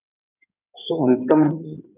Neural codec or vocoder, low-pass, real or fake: codec, 16 kHz in and 24 kHz out, 2.2 kbps, FireRedTTS-2 codec; 3.6 kHz; fake